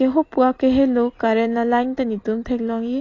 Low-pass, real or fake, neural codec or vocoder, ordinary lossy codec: 7.2 kHz; real; none; AAC, 32 kbps